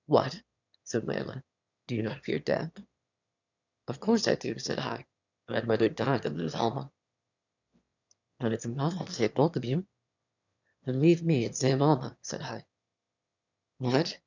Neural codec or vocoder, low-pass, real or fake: autoencoder, 22.05 kHz, a latent of 192 numbers a frame, VITS, trained on one speaker; 7.2 kHz; fake